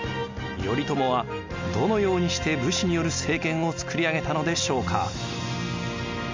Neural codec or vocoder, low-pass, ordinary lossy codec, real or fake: none; 7.2 kHz; MP3, 64 kbps; real